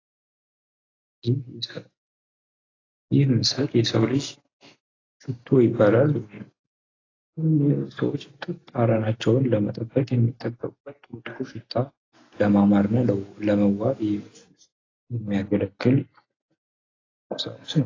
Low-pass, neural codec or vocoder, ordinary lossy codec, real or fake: 7.2 kHz; none; AAC, 32 kbps; real